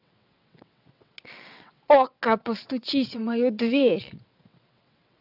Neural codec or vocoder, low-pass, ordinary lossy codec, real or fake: vocoder, 22.05 kHz, 80 mel bands, WaveNeXt; 5.4 kHz; none; fake